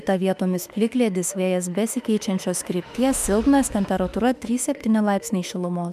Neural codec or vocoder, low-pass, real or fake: autoencoder, 48 kHz, 32 numbers a frame, DAC-VAE, trained on Japanese speech; 14.4 kHz; fake